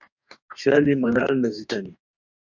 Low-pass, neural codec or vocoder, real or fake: 7.2 kHz; codec, 44.1 kHz, 2.6 kbps, DAC; fake